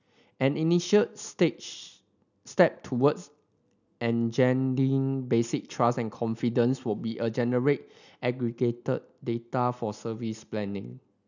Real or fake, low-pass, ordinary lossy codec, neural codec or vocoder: real; 7.2 kHz; none; none